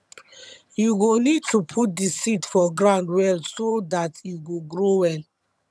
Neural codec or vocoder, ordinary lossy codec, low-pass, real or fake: vocoder, 22.05 kHz, 80 mel bands, HiFi-GAN; none; none; fake